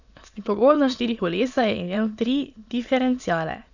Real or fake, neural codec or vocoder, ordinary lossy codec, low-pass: fake; autoencoder, 22.05 kHz, a latent of 192 numbers a frame, VITS, trained on many speakers; none; 7.2 kHz